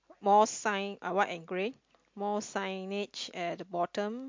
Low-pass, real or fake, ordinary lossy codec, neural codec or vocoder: 7.2 kHz; real; MP3, 48 kbps; none